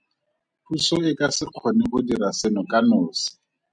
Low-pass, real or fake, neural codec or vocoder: 9.9 kHz; real; none